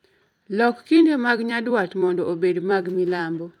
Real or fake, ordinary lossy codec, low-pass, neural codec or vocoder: fake; none; 19.8 kHz; vocoder, 44.1 kHz, 128 mel bands every 256 samples, BigVGAN v2